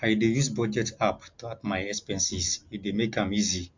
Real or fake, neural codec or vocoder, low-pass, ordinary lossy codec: real; none; 7.2 kHz; MP3, 64 kbps